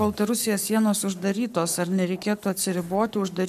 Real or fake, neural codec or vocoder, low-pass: fake; codec, 44.1 kHz, 7.8 kbps, Pupu-Codec; 14.4 kHz